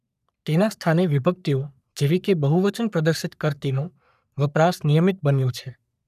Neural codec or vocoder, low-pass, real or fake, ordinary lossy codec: codec, 44.1 kHz, 3.4 kbps, Pupu-Codec; 14.4 kHz; fake; none